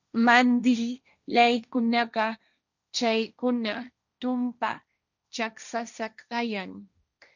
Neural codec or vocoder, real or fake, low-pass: codec, 16 kHz, 1.1 kbps, Voila-Tokenizer; fake; 7.2 kHz